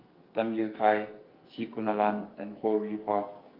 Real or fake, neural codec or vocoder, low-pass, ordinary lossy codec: fake; codec, 44.1 kHz, 2.6 kbps, SNAC; 5.4 kHz; Opus, 32 kbps